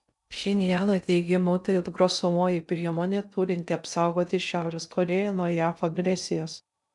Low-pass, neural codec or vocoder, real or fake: 10.8 kHz; codec, 16 kHz in and 24 kHz out, 0.6 kbps, FocalCodec, streaming, 2048 codes; fake